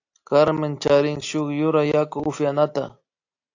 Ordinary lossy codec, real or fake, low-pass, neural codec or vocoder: AAC, 48 kbps; real; 7.2 kHz; none